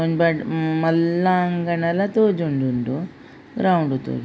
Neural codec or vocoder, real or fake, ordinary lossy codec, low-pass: none; real; none; none